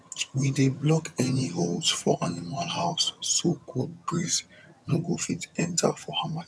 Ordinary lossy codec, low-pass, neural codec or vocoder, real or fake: none; none; vocoder, 22.05 kHz, 80 mel bands, HiFi-GAN; fake